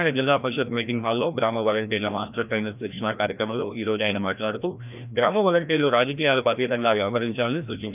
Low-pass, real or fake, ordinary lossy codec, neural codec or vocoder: 3.6 kHz; fake; none; codec, 16 kHz, 1 kbps, FreqCodec, larger model